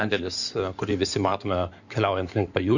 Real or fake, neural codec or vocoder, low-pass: fake; codec, 16 kHz in and 24 kHz out, 2.2 kbps, FireRedTTS-2 codec; 7.2 kHz